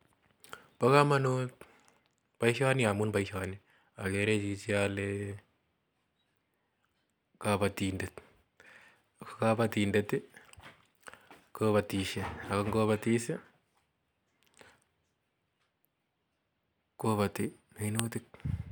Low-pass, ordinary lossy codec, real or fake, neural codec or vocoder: none; none; real; none